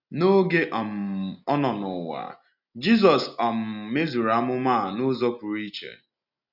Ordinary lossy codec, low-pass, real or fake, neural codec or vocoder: none; 5.4 kHz; real; none